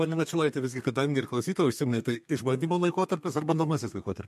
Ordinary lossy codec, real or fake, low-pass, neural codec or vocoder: MP3, 64 kbps; fake; 14.4 kHz; codec, 32 kHz, 1.9 kbps, SNAC